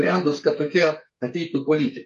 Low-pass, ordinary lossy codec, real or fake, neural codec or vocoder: 14.4 kHz; MP3, 48 kbps; fake; codec, 32 kHz, 1.9 kbps, SNAC